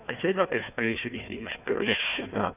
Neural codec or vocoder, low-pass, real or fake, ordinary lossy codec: codec, 16 kHz, 1 kbps, FunCodec, trained on Chinese and English, 50 frames a second; 3.6 kHz; fake; none